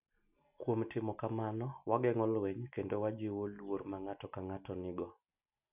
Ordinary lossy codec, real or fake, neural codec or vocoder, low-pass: none; real; none; 3.6 kHz